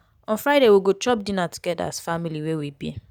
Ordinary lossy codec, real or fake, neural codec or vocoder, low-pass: none; real; none; none